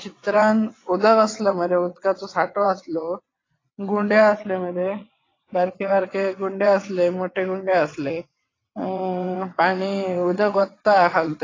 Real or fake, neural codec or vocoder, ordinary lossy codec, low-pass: fake; vocoder, 22.05 kHz, 80 mel bands, WaveNeXt; AAC, 32 kbps; 7.2 kHz